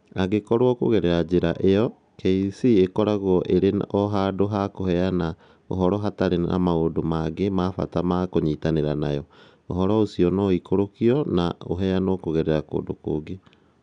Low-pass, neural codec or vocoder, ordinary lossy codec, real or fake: 9.9 kHz; none; none; real